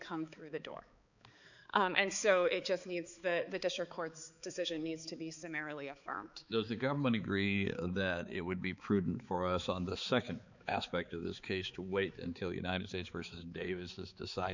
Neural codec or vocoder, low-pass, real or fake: codec, 16 kHz, 4 kbps, X-Codec, HuBERT features, trained on balanced general audio; 7.2 kHz; fake